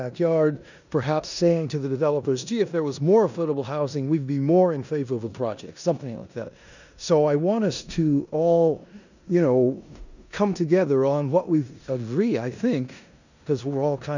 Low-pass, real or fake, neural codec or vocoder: 7.2 kHz; fake; codec, 16 kHz in and 24 kHz out, 0.9 kbps, LongCat-Audio-Codec, four codebook decoder